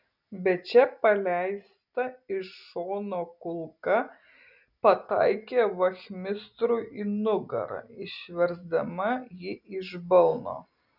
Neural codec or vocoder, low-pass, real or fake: none; 5.4 kHz; real